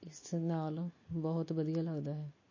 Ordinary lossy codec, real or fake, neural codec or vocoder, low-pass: MP3, 32 kbps; real; none; 7.2 kHz